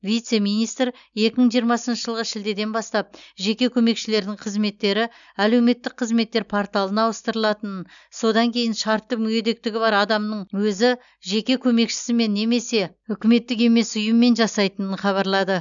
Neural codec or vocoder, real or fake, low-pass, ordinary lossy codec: none; real; 7.2 kHz; none